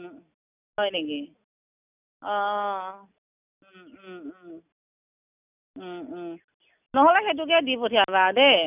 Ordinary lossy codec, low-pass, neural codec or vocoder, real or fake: none; 3.6 kHz; none; real